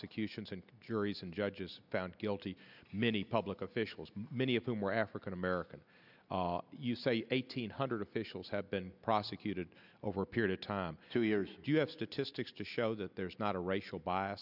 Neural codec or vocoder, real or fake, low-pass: none; real; 5.4 kHz